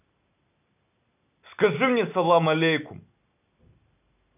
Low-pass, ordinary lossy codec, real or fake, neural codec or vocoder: 3.6 kHz; none; real; none